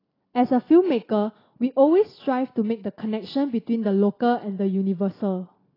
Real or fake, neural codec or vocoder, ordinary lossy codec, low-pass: real; none; AAC, 24 kbps; 5.4 kHz